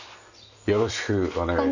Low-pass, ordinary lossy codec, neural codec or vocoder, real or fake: 7.2 kHz; none; none; real